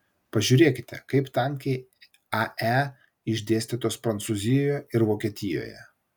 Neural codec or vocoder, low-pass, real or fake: none; 19.8 kHz; real